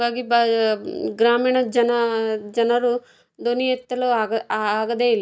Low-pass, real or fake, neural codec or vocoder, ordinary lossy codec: none; real; none; none